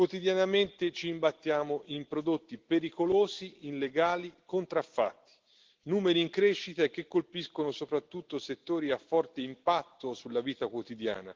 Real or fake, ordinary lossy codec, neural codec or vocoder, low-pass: real; Opus, 16 kbps; none; 7.2 kHz